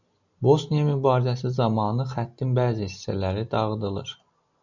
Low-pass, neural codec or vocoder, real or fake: 7.2 kHz; none; real